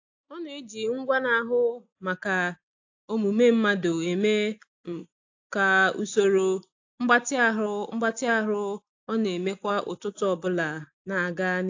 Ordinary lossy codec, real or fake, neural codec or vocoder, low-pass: AAC, 48 kbps; real; none; 7.2 kHz